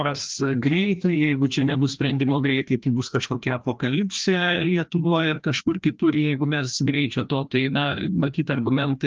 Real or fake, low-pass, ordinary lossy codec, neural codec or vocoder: fake; 7.2 kHz; Opus, 24 kbps; codec, 16 kHz, 1 kbps, FreqCodec, larger model